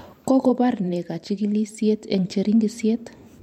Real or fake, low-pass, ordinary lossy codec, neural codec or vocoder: fake; 19.8 kHz; MP3, 64 kbps; vocoder, 44.1 kHz, 128 mel bands every 512 samples, BigVGAN v2